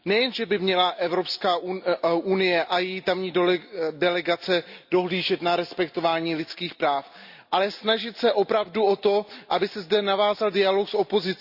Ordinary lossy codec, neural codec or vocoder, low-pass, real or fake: Opus, 64 kbps; none; 5.4 kHz; real